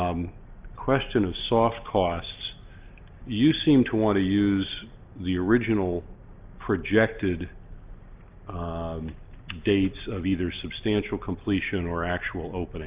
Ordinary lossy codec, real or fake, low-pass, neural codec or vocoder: Opus, 32 kbps; real; 3.6 kHz; none